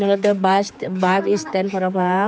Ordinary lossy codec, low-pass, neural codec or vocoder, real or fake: none; none; codec, 16 kHz, 4 kbps, X-Codec, HuBERT features, trained on general audio; fake